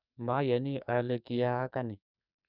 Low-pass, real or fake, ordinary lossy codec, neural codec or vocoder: 5.4 kHz; fake; none; codec, 44.1 kHz, 2.6 kbps, SNAC